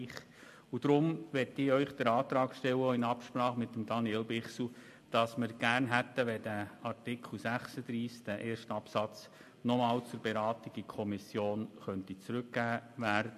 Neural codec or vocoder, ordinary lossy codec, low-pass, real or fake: none; MP3, 64 kbps; 14.4 kHz; real